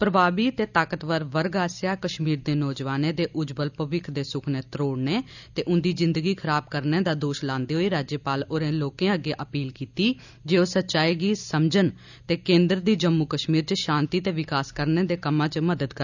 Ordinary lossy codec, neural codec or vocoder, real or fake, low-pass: none; none; real; 7.2 kHz